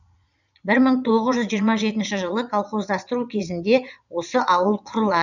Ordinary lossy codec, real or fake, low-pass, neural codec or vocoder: none; fake; 7.2 kHz; vocoder, 22.05 kHz, 80 mel bands, Vocos